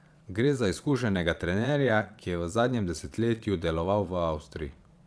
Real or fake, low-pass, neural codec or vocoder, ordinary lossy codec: fake; none; vocoder, 22.05 kHz, 80 mel bands, Vocos; none